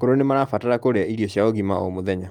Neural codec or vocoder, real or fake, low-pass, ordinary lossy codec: none; real; 19.8 kHz; Opus, 32 kbps